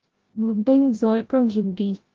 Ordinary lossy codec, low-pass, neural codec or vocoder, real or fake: Opus, 16 kbps; 7.2 kHz; codec, 16 kHz, 0.5 kbps, FreqCodec, larger model; fake